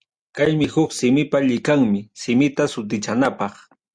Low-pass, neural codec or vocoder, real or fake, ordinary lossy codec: 9.9 kHz; none; real; Opus, 64 kbps